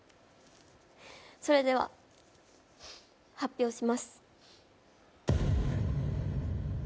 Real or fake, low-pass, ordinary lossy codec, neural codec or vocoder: real; none; none; none